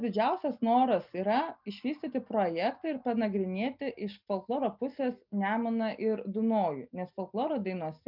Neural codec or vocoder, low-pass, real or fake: none; 5.4 kHz; real